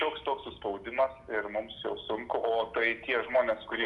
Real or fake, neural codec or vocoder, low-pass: real; none; 10.8 kHz